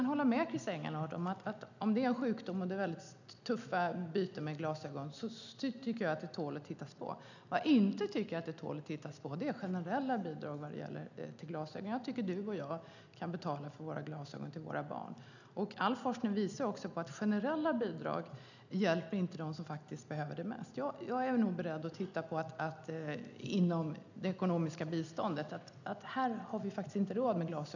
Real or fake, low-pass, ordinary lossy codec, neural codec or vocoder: real; 7.2 kHz; none; none